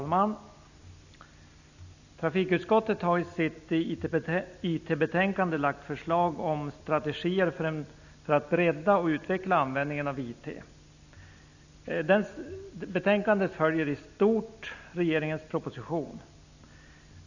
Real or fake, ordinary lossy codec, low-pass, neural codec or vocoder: real; none; 7.2 kHz; none